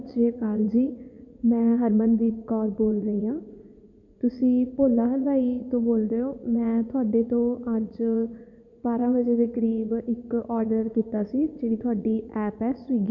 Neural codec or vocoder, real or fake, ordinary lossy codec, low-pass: vocoder, 44.1 kHz, 80 mel bands, Vocos; fake; none; 7.2 kHz